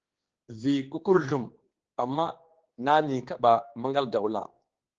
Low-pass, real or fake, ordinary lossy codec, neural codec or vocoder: 7.2 kHz; fake; Opus, 16 kbps; codec, 16 kHz, 2 kbps, X-Codec, HuBERT features, trained on general audio